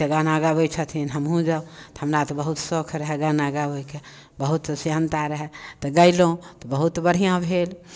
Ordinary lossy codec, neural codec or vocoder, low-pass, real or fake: none; none; none; real